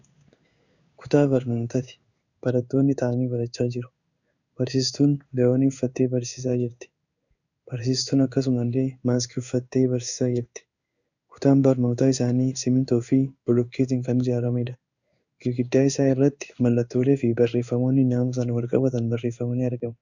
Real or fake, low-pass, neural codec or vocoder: fake; 7.2 kHz; codec, 16 kHz in and 24 kHz out, 1 kbps, XY-Tokenizer